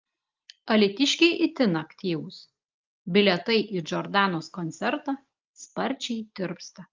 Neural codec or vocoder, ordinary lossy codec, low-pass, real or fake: none; Opus, 24 kbps; 7.2 kHz; real